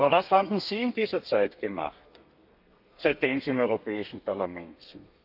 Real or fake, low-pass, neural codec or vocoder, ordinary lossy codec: fake; 5.4 kHz; codec, 32 kHz, 1.9 kbps, SNAC; none